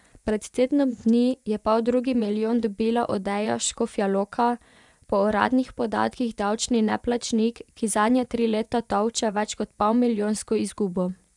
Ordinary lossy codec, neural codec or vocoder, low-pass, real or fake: none; vocoder, 24 kHz, 100 mel bands, Vocos; 10.8 kHz; fake